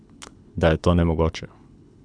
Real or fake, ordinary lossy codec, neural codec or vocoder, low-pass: fake; none; codec, 24 kHz, 6 kbps, HILCodec; 9.9 kHz